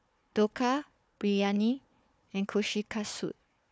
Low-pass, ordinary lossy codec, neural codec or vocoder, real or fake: none; none; codec, 16 kHz, 4 kbps, FunCodec, trained on Chinese and English, 50 frames a second; fake